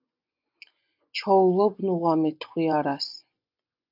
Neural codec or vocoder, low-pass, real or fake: vocoder, 24 kHz, 100 mel bands, Vocos; 5.4 kHz; fake